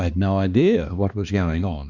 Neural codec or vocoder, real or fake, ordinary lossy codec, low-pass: codec, 16 kHz, 2 kbps, X-Codec, HuBERT features, trained on balanced general audio; fake; Opus, 64 kbps; 7.2 kHz